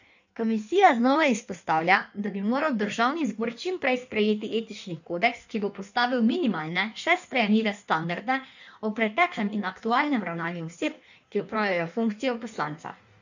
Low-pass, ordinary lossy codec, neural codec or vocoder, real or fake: 7.2 kHz; none; codec, 16 kHz in and 24 kHz out, 1.1 kbps, FireRedTTS-2 codec; fake